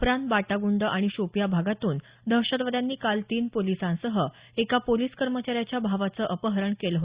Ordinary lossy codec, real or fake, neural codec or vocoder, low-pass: Opus, 64 kbps; real; none; 3.6 kHz